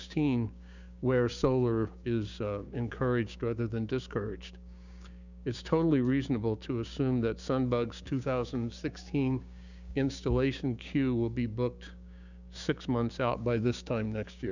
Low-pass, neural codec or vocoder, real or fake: 7.2 kHz; codec, 16 kHz, 6 kbps, DAC; fake